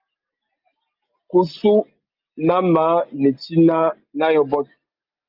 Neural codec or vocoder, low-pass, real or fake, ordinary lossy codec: none; 5.4 kHz; real; Opus, 24 kbps